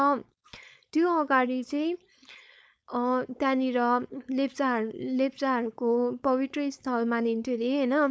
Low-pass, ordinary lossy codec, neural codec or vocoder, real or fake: none; none; codec, 16 kHz, 4.8 kbps, FACodec; fake